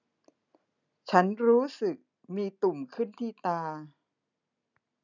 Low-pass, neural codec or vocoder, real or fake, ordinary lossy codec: 7.2 kHz; none; real; none